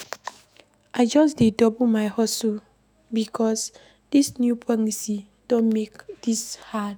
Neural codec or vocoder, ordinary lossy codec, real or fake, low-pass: autoencoder, 48 kHz, 128 numbers a frame, DAC-VAE, trained on Japanese speech; none; fake; none